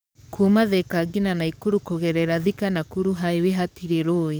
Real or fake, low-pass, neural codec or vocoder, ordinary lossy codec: fake; none; codec, 44.1 kHz, 7.8 kbps, DAC; none